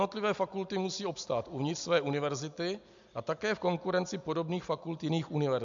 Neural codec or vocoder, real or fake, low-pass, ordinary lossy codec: none; real; 7.2 kHz; MP3, 64 kbps